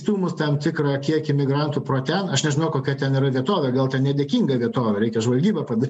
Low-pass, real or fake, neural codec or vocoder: 10.8 kHz; real; none